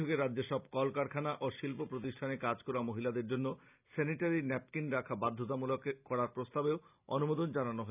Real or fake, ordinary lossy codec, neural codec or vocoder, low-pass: real; none; none; 3.6 kHz